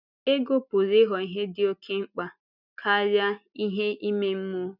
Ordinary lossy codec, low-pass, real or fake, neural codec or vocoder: none; 5.4 kHz; real; none